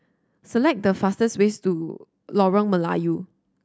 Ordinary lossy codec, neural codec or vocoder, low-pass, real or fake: none; none; none; real